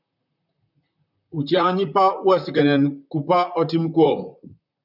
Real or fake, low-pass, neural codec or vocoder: fake; 5.4 kHz; vocoder, 44.1 kHz, 128 mel bands, Pupu-Vocoder